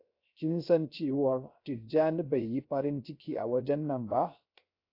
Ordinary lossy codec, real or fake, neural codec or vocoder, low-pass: AAC, 32 kbps; fake; codec, 16 kHz, 0.3 kbps, FocalCodec; 5.4 kHz